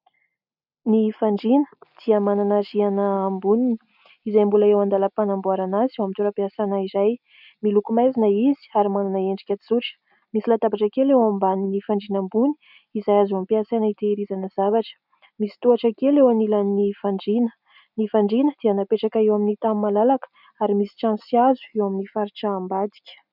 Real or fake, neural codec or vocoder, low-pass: real; none; 5.4 kHz